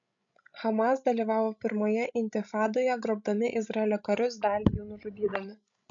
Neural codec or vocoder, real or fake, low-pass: codec, 16 kHz, 16 kbps, FreqCodec, larger model; fake; 7.2 kHz